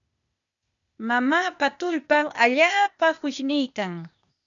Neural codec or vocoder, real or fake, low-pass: codec, 16 kHz, 0.8 kbps, ZipCodec; fake; 7.2 kHz